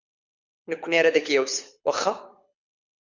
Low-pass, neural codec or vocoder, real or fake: 7.2 kHz; codec, 24 kHz, 6 kbps, HILCodec; fake